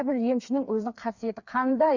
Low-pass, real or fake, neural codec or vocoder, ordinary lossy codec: 7.2 kHz; fake; codec, 16 kHz, 4 kbps, FreqCodec, smaller model; Opus, 64 kbps